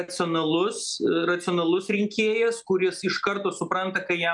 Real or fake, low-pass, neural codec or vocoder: real; 10.8 kHz; none